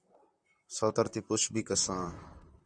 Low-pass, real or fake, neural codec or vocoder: 9.9 kHz; fake; vocoder, 44.1 kHz, 128 mel bands, Pupu-Vocoder